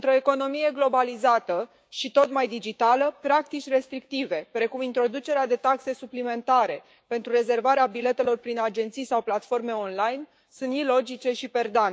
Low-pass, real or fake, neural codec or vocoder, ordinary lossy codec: none; fake; codec, 16 kHz, 6 kbps, DAC; none